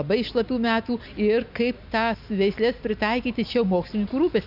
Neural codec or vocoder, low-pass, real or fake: none; 5.4 kHz; real